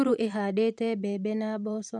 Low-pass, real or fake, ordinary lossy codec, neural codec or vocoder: 10.8 kHz; fake; none; vocoder, 44.1 kHz, 128 mel bands every 512 samples, BigVGAN v2